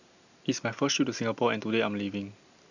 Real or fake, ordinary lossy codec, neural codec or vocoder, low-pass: real; none; none; 7.2 kHz